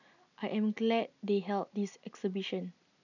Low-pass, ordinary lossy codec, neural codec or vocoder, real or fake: 7.2 kHz; none; none; real